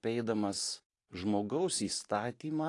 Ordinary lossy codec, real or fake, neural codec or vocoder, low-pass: AAC, 48 kbps; fake; vocoder, 44.1 kHz, 128 mel bands every 512 samples, BigVGAN v2; 10.8 kHz